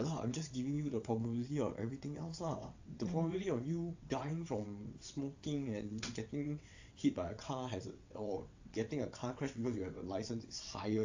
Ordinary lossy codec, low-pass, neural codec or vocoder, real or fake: AAC, 48 kbps; 7.2 kHz; vocoder, 22.05 kHz, 80 mel bands, WaveNeXt; fake